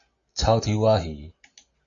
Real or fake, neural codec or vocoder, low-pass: real; none; 7.2 kHz